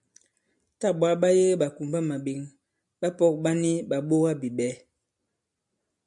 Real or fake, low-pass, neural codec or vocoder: real; 10.8 kHz; none